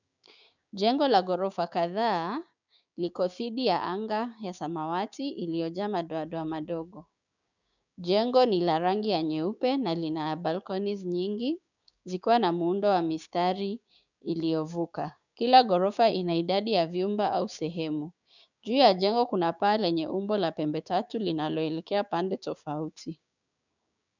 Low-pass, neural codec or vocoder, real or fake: 7.2 kHz; codec, 16 kHz, 6 kbps, DAC; fake